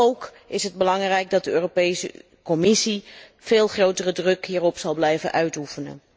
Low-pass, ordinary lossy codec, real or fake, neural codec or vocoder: none; none; real; none